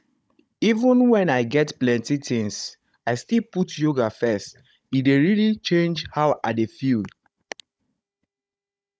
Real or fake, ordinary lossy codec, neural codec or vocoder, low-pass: fake; none; codec, 16 kHz, 16 kbps, FunCodec, trained on Chinese and English, 50 frames a second; none